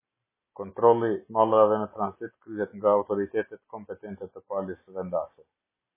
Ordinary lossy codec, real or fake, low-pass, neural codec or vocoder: MP3, 16 kbps; real; 3.6 kHz; none